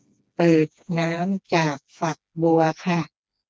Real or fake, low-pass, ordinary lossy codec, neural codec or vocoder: fake; none; none; codec, 16 kHz, 2 kbps, FreqCodec, smaller model